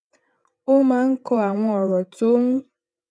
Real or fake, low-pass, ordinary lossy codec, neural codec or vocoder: fake; none; none; vocoder, 22.05 kHz, 80 mel bands, WaveNeXt